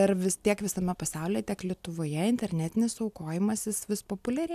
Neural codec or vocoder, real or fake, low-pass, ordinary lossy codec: none; real; 14.4 kHz; AAC, 96 kbps